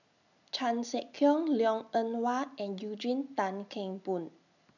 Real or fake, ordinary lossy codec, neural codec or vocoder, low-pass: real; none; none; 7.2 kHz